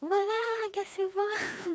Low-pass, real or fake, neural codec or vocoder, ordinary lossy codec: none; fake; codec, 16 kHz, 2 kbps, FreqCodec, larger model; none